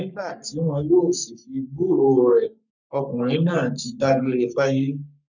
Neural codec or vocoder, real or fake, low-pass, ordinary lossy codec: codec, 44.1 kHz, 3.4 kbps, Pupu-Codec; fake; 7.2 kHz; none